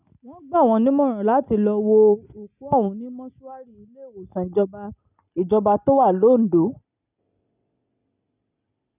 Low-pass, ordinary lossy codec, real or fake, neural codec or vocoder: 3.6 kHz; none; real; none